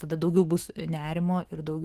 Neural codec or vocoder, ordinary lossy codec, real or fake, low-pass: autoencoder, 48 kHz, 128 numbers a frame, DAC-VAE, trained on Japanese speech; Opus, 16 kbps; fake; 14.4 kHz